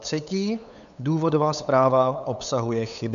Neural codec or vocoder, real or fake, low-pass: codec, 16 kHz, 8 kbps, FunCodec, trained on LibriTTS, 25 frames a second; fake; 7.2 kHz